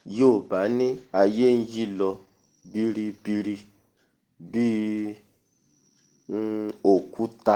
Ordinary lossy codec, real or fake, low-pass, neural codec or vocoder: Opus, 16 kbps; real; 19.8 kHz; none